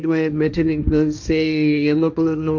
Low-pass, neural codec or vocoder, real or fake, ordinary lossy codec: 7.2 kHz; codec, 16 kHz, 1.1 kbps, Voila-Tokenizer; fake; none